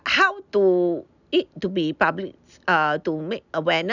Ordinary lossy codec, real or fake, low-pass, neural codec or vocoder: none; real; 7.2 kHz; none